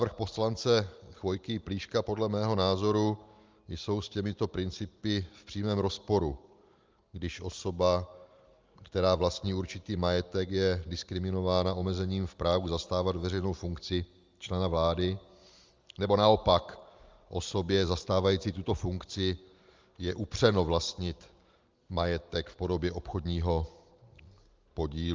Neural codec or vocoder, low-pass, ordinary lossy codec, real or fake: none; 7.2 kHz; Opus, 32 kbps; real